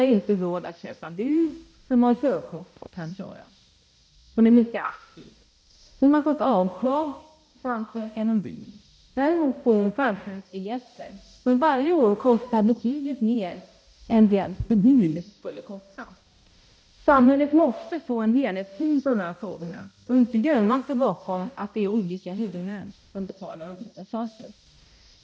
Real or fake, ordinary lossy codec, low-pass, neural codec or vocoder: fake; none; none; codec, 16 kHz, 0.5 kbps, X-Codec, HuBERT features, trained on balanced general audio